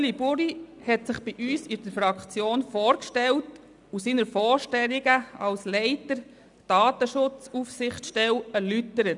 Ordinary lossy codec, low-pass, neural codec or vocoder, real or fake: none; 10.8 kHz; none; real